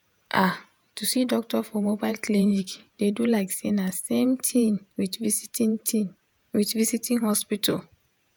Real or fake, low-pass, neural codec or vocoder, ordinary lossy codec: fake; none; vocoder, 48 kHz, 128 mel bands, Vocos; none